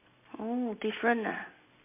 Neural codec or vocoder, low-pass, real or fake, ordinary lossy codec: none; 3.6 kHz; real; MP3, 24 kbps